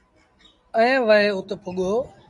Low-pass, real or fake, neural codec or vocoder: 10.8 kHz; real; none